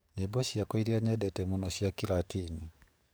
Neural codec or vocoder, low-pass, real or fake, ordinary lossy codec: codec, 44.1 kHz, 7.8 kbps, DAC; none; fake; none